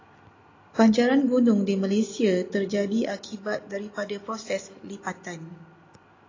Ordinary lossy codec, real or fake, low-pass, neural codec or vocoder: AAC, 32 kbps; real; 7.2 kHz; none